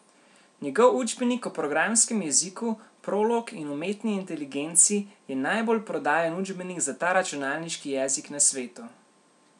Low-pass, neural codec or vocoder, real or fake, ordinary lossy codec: 10.8 kHz; none; real; none